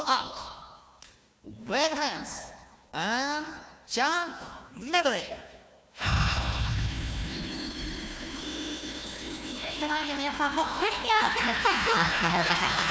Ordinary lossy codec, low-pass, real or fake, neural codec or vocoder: none; none; fake; codec, 16 kHz, 1 kbps, FunCodec, trained on Chinese and English, 50 frames a second